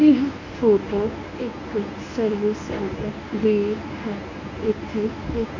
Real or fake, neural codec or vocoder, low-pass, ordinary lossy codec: fake; codec, 24 kHz, 0.9 kbps, WavTokenizer, medium speech release version 1; 7.2 kHz; none